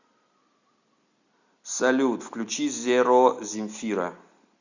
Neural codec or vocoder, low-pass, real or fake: none; 7.2 kHz; real